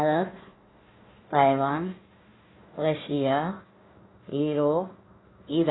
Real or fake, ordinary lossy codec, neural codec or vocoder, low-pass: fake; AAC, 16 kbps; codec, 16 kHz, 1 kbps, FunCodec, trained on Chinese and English, 50 frames a second; 7.2 kHz